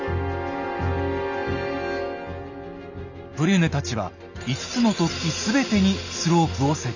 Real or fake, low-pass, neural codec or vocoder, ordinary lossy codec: real; 7.2 kHz; none; none